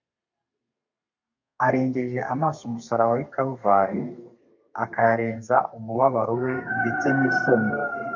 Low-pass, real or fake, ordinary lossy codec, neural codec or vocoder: 7.2 kHz; fake; MP3, 48 kbps; codec, 32 kHz, 1.9 kbps, SNAC